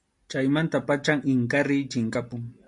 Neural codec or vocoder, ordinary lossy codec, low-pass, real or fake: none; MP3, 64 kbps; 10.8 kHz; real